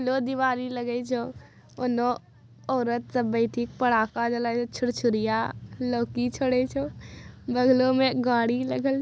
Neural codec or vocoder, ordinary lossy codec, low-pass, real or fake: none; none; none; real